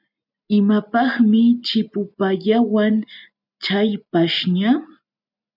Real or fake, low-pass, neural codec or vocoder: real; 5.4 kHz; none